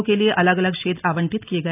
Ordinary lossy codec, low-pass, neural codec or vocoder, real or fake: none; 3.6 kHz; none; real